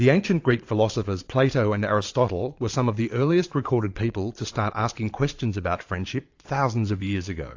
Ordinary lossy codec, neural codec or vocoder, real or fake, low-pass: AAC, 48 kbps; vocoder, 22.05 kHz, 80 mel bands, Vocos; fake; 7.2 kHz